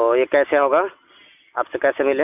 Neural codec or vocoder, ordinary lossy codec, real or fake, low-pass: none; none; real; 3.6 kHz